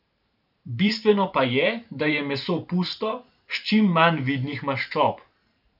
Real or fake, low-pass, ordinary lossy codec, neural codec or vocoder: fake; 5.4 kHz; none; vocoder, 44.1 kHz, 128 mel bands every 512 samples, BigVGAN v2